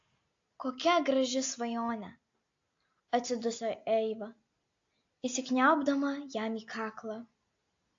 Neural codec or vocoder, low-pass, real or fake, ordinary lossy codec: none; 7.2 kHz; real; AAC, 48 kbps